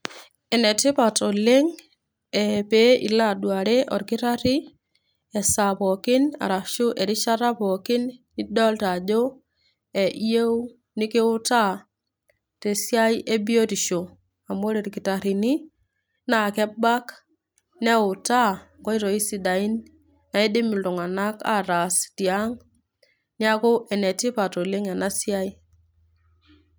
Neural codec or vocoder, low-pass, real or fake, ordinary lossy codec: none; none; real; none